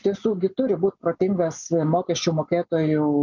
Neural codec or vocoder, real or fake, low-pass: none; real; 7.2 kHz